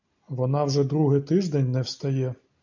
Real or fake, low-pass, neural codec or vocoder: real; 7.2 kHz; none